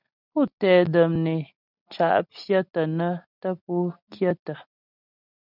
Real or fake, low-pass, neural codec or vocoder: real; 5.4 kHz; none